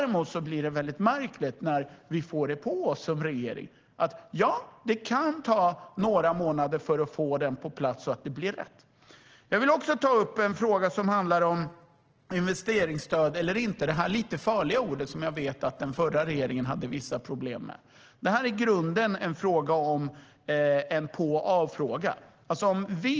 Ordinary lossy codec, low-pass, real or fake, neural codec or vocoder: Opus, 16 kbps; 7.2 kHz; real; none